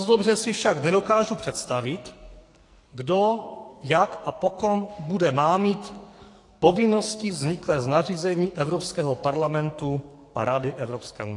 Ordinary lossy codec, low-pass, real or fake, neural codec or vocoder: AAC, 48 kbps; 10.8 kHz; fake; codec, 44.1 kHz, 2.6 kbps, SNAC